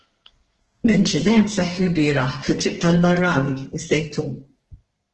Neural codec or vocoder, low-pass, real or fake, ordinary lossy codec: codec, 32 kHz, 1.9 kbps, SNAC; 10.8 kHz; fake; Opus, 16 kbps